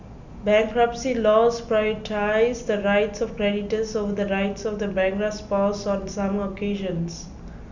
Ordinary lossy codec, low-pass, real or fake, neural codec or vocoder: none; 7.2 kHz; real; none